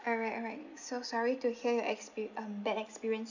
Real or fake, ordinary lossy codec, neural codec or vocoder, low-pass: real; none; none; 7.2 kHz